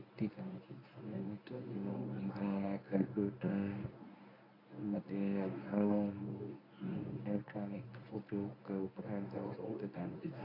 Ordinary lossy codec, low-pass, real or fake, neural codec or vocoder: AAC, 48 kbps; 5.4 kHz; fake; codec, 24 kHz, 0.9 kbps, WavTokenizer, medium speech release version 1